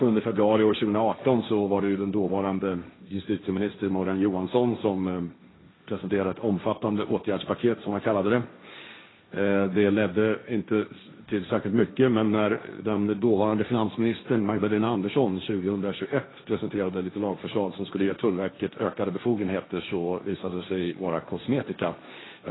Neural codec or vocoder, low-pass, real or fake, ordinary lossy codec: codec, 16 kHz, 1.1 kbps, Voila-Tokenizer; 7.2 kHz; fake; AAC, 16 kbps